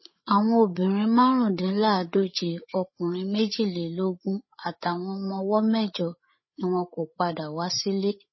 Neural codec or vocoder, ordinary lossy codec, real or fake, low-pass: codec, 16 kHz, 8 kbps, FreqCodec, larger model; MP3, 24 kbps; fake; 7.2 kHz